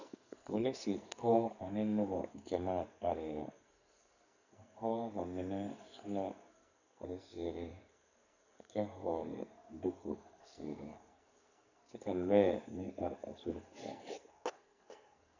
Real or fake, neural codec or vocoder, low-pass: fake; codec, 44.1 kHz, 2.6 kbps, SNAC; 7.2 kHz